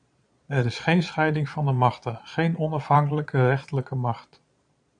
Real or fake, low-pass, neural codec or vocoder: fake; 9.9 kHz; vocoder, 22.05 kHz, 80 mel bands, Vocos